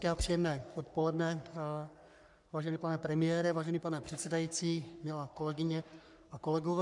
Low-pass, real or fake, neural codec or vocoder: 10.8 kHz; fake; codec, 44.1 kHz, 3.4 kbps, Pupu-Codec